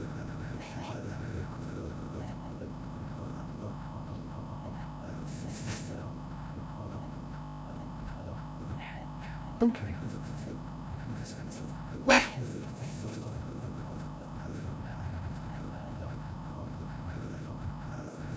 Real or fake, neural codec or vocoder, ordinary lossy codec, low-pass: fake; codec, 16 kHz, 0.5 kbps, FreqCodec, larger model; none; none